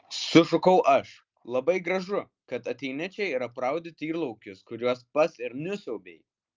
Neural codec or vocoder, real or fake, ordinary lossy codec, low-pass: none; real; Opus, 24 kbps; 7.2 kHz